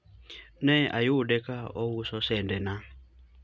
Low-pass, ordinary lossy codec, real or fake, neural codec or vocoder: none; none; real; none